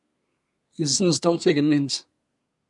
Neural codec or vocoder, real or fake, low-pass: codec, 24 kHz, 1 kbps, SNAC; fake; 10.8 kHz